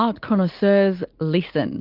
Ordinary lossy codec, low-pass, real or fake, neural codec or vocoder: Opus, 32 kbps; 5.4 kHz; real; none